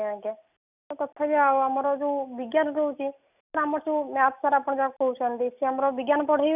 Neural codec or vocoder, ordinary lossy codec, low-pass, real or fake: none; none; 3.6 kHz; real